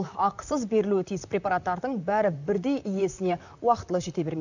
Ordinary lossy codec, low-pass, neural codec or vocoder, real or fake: none; 7.2 kHz; vocoder, 44.1 kHz, 128 mel bands, Pupu-Vocoder; fake